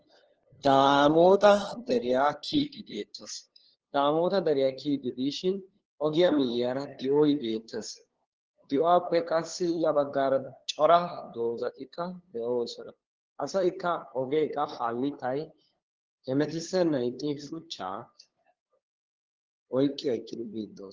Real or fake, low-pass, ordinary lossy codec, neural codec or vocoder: fake; 7.2 kHz; Opus, 16 kbps; codec, 16 kHz, 2 kbps, FunCodec, trained on LibriTTS, 25 frames a second